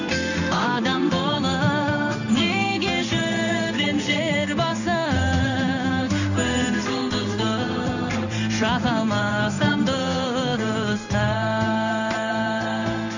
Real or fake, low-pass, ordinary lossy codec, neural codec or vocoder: fake; 7.2 kHz; none; codec, 16 kHz in and 24 kHz out, 1 kbps, XY-Tokenizer